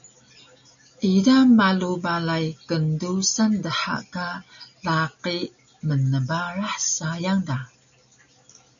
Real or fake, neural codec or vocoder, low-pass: real; none; 7.2 kHz